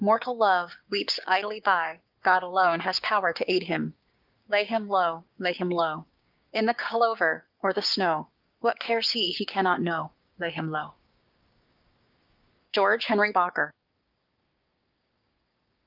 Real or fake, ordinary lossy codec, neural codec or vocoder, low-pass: fake; Opus, 32 kbps; codec, 16 kHz, 6 kbps, DAC; 5.4 kHz